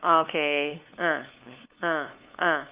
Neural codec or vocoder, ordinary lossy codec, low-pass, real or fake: codec, 16 kHz, 2 kbps, FunCodec, trained on LibriTTS, 25 frames a second; Opus, 24 kbps; 3.6 kHz; fake